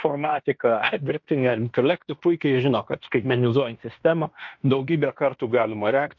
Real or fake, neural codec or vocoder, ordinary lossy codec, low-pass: fake; codec, 16 kHz in and 24 kHz out, 0.9 kbps, LongCat-Audio-Codec, fine tuned four codebook decoder; MP3, 48 kbps; 7.2 kHz